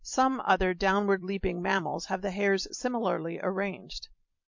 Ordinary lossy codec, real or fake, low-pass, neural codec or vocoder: MP3, 48 kbps; fake; 7.2 kHz; vocoder, 44.1 kHz, 128 mel bands every 256 samples, BigVGAN v2